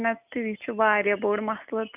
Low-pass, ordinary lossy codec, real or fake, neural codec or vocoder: 3.6 kHz; none; real; none